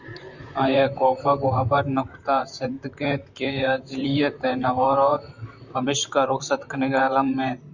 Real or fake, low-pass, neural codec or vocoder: fake; 7.2 kHz; vocoder, 44.1 kHz, 128 mel bands, Pupu-Vocoder